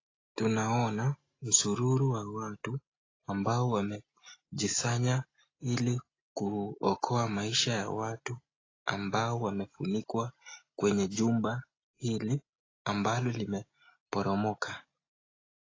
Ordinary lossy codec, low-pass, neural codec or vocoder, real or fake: AAC, 32 kbps; 7.2 kHz; none; real